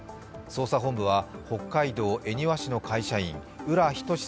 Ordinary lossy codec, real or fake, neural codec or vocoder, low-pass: none; real; none; none